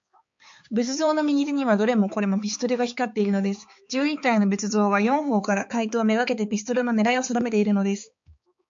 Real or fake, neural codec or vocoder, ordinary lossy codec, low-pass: fake; codec, 16 kHz, 4 kbps, X-Codec, HuBERT features, trained on balanced general audio; MP3, 48 kbps; 7.2 kHz